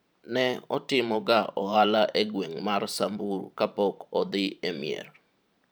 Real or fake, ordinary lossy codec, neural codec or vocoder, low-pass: fake; none; vocoder, 44.1 kHz, 128 mel bands every 512 samples, BigVGAN v2; none